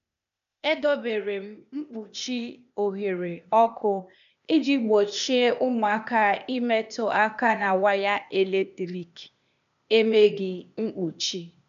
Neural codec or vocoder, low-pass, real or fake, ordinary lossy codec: codec, 16 kHz, 0.8 kbps, ZipCodec; 7.2 kHz; fake; none